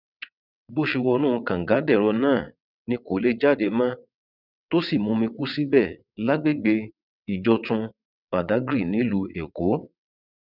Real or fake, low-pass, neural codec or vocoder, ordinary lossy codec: fake; 5.4 kHz; vocoder, 22.05 kHz, 80 mel bands, WaveNeXt; none